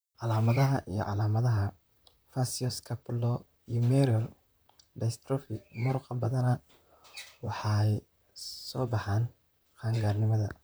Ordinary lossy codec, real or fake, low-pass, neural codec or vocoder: none; fake; none; vocoder, 44.1 kHz, 128 mel bands, Pupu-Vocoder